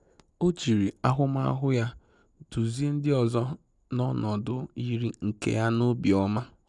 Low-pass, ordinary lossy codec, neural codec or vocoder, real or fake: 10.8 kHz; none; none; real